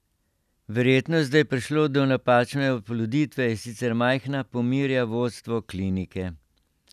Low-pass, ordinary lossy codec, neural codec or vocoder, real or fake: 14.4 kHz; none; none; real